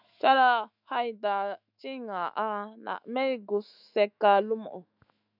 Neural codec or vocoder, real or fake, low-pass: autoencoder, 48 kHz, 128 numbers a frame, DAC-VAE, trained on Japanese speech; fake; 5.4 kHz